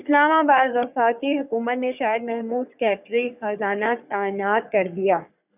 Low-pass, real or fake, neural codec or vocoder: 3.6 kHz; fake; codec, 44.1 kHz, 3.4 kbps, Pupu-Codec